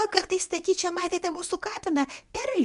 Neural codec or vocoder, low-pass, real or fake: codec, 24 kHz, 0.9 kbps, WavTokenizer, medium speech release version 1; 10.8 kHz; fake